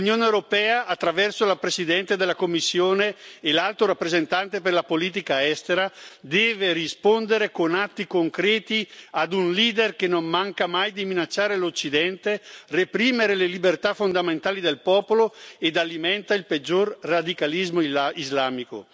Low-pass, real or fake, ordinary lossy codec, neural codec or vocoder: none; real; none; none